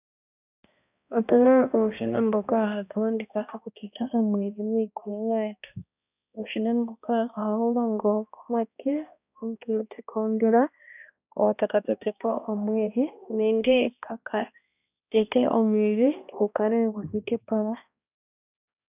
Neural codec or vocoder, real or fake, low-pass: codec, 16 kHz, 1 kbps, X-Codec, HuBERT features, trained on balanced general audio; fake; 3.6 kHz